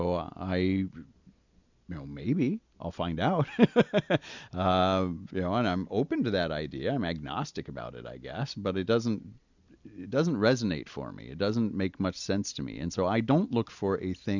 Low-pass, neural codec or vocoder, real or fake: 7.2 kHz; none; real